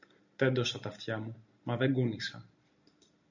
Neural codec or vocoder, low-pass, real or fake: none; 7.2 kHz; real